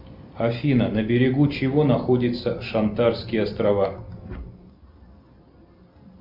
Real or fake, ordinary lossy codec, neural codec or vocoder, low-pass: real; MP3, 48 kbps; none; 5.4 kHz